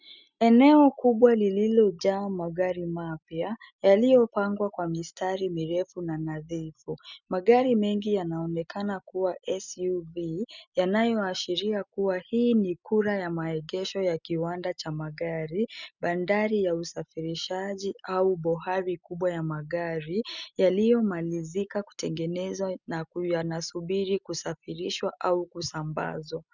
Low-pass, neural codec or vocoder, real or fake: 7.2 kHz; none; real